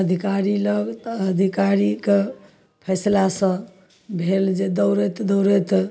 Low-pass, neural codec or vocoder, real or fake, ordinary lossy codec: none; none; real; none